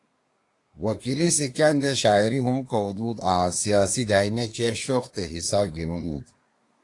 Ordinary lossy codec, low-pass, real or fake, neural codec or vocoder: AAC, 48 kbps; 10.8 kHz; fake; codec, 24 kHz, 1 kbps, SNAC